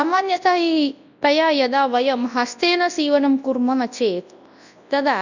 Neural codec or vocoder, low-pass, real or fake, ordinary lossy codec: codec, 24 kHz, 0.9 kbps, WavTokenizer, large speech release; 7.2 kHz; fake; none